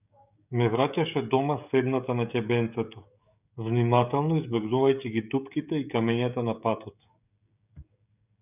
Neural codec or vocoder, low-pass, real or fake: codec, 16 kHz, 16 kbps, FreqCodec, smaller model; 3.6 kHz; fake